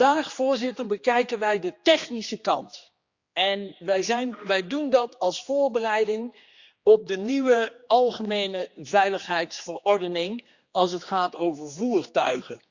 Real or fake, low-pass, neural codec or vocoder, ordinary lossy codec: fake; 7.2 kHz; codec, 16 kHz, 2 kbps, X-Codec, HuBERT features, trained on general audio; Opus, 64 kbps